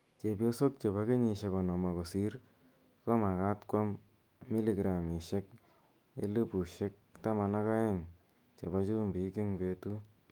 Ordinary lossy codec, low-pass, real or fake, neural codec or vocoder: Opus, 32 kbps; 19.8 kHz; fake; autoencoder, 48 kHz, 128 numbers a frame, DAC-VAE, trained on Japanese speech